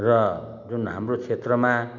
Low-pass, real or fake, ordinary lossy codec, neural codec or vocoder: 7.2 kHz; real; MP3, 64 kbps; none